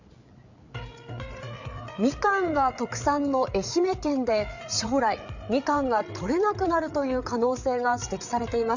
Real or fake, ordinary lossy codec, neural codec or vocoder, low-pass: fake; none; codec, 16 kHz, 8 kbps, FreqCodec, larger model; 7.2 kHz